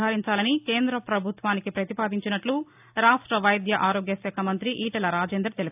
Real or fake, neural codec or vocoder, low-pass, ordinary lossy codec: real; none; 3.6 kHz; AAC, 32 kbps